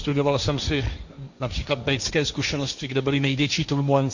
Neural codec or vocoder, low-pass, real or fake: codec, 16 kHz, 1.1 kbps, Voila-Tokenizer; 7.2 kHz; fake